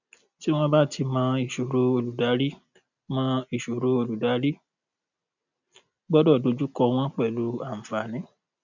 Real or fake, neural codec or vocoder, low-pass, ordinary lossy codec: real; none; 7.2 kHz; none